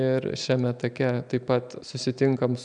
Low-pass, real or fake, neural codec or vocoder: 9.9 kHz; real; none